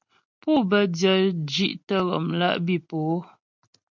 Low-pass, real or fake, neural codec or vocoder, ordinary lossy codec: 7.2 kHz; real; none; MP3, 64 kbps